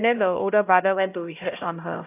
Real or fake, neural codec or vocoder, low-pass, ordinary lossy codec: fake; codec, 16 kHz, 0.5 kbps, X-Codec, HuBERT features, trained on LibriSpeech; 3.6 kHz; AAC, 32 kbps